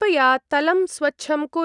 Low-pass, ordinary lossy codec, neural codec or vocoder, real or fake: 10.8 kHz; none; none; real